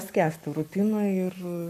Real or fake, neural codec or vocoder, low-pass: fake; codec, 44.1 kHz, 7.8 kbps, Pupu-Codec; 14.4 kHz